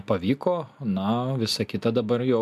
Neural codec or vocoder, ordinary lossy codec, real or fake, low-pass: none; MP3, 96 kbps; real; 14.4 kHz